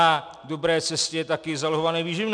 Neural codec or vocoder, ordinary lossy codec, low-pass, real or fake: none; MP3, 96 kbps; 9.9 kHz; real